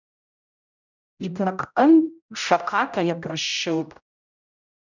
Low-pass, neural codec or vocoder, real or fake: 7.2 kHz; codec, 16 kHz, 0.5 kbps, X-Codec, HuBERT features, trained on general audio; fake